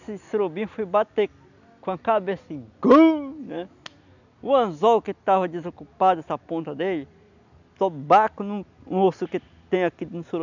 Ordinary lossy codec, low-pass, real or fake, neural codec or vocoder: none; 7.2 kHz; real; none